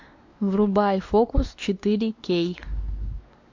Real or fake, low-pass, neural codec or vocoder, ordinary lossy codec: fake; 7.2 kHz; codec, 16 kHz, 2 kbps, X-Codec, WavLM features, trained on Multilingual LibriSpeech; AAC, 48 kbps